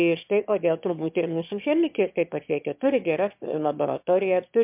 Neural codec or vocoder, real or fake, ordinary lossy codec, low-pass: autoencoder, 22.05 kHz, a latent of 192 numbers a frame, VITS, trained on one speaker; fake; MP3, 32 kbps; 3.6 kHz